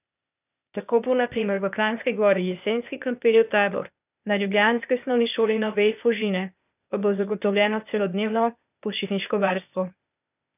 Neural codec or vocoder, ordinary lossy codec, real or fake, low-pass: codec, 16 kHz, 0.8 kbps, ZipCodec; none; fake; 3.6 kHz